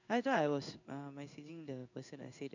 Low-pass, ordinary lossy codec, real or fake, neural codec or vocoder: 7.2 kHz; none; fake; codec, 16 kHz in and 24 kHz out, 1 kbps, XY-Tokenizer